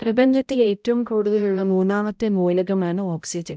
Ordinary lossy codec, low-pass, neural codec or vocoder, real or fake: none; none; codec, 16 kHz, 0.5 kbps, X-Codec, HuBERT features, trained on balanced general audio; fake